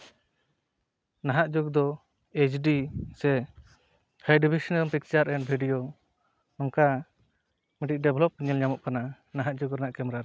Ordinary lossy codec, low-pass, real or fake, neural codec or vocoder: none; none; real; none